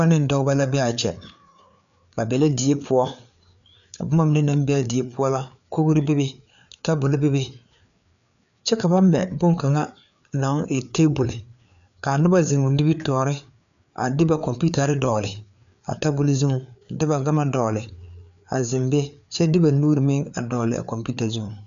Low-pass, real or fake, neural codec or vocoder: 7.2 kHz; fake; codec, 16 kHz, 4 kbps, FreqCodec, larger model